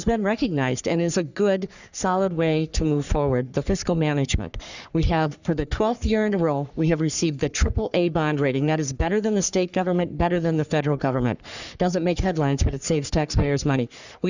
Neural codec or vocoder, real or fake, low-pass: codec, 44.1 kHz, 3.4 kbps, Pupu-Codec; fake; 7.2 kHz